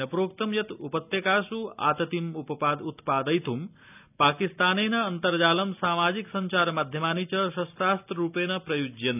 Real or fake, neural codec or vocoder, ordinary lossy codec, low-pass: real; none; none; 3.6 kHz